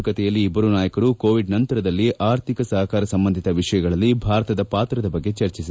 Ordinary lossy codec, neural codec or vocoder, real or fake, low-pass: none; none; real; none